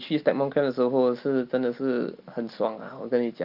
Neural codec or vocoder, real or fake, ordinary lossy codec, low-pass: none; real; Opus, 24 kbps; 5.4 kHz